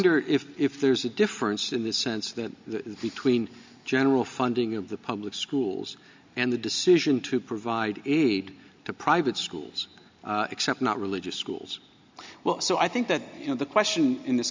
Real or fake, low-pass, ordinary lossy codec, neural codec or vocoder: real; 7.2 kHz; MP3, 64 kbps; none